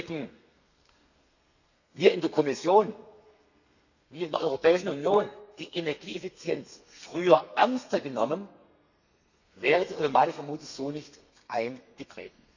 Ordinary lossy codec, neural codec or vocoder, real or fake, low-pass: none; codec, 32 kHz, 1.9 kbps, SNAC; fake; 7.2 kHz